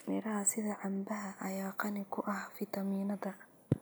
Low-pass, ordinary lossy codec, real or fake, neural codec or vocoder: none; none; real; none